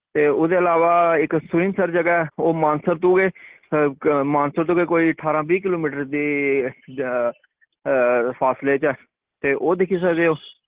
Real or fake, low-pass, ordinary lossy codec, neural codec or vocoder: real; 3.6 kHz; Opus, 16 kbps; none